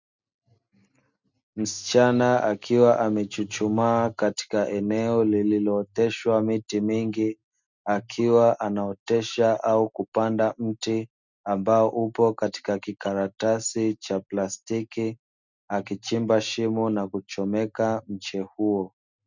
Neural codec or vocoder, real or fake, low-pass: none; real; 7.2 kHz